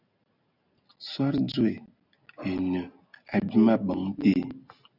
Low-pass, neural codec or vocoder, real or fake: 5.4 kHz; none; real